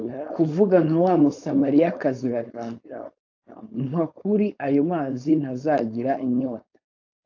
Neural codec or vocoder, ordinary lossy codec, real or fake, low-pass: codec, 16 kHz, 4.8 kbps, FACodec; AAC, 48 kbps; fake; 7.2 kHz